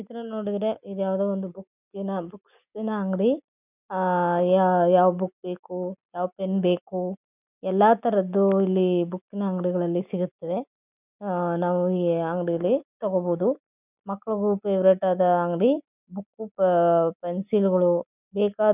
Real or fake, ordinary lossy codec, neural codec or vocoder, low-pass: real; none; none; 3.6 kHz